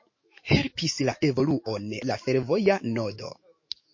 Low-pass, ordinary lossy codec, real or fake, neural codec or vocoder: 7.2 kHz; MP3, 32 kbps; fake; autoencoder, 48 kHz, 128 numbers a frame, DAC-VAE, trained on Japanese speech